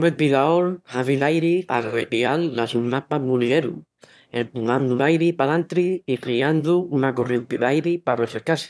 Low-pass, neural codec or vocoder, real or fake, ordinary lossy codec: none; autoencoder, 22.05 kHz, a latent of 192 numbers a frame, VITS, trained on one speaker; fake; none